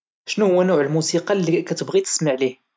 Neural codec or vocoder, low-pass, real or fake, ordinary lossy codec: none; none; real; none